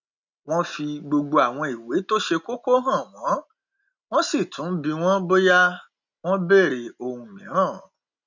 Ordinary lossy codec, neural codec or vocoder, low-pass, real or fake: none; none; 7.2 kHz; real